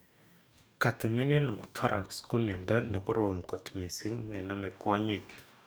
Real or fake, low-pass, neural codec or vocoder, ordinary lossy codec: fake; none; codec, 44.1 kHz, 2.6 kbps, DAC; none